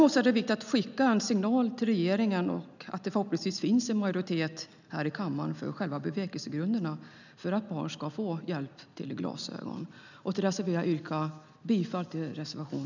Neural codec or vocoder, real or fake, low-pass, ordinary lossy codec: none; real; 7.2 kHz; none